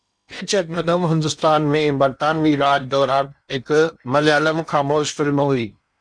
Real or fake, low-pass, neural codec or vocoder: fake; 9.9 kHz; codec, 16 kHz in and 24 kHz out, 0.8 kbps, FocalCodec, streaming, 65536 codes